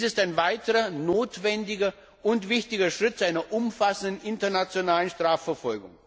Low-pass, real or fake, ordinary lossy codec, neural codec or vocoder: none; real; none; none